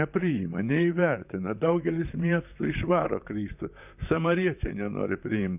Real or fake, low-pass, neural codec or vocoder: fake; 3.6 kHz; vocoder, 44.1 kHz, 128 mel bands, Pupu-Vocoder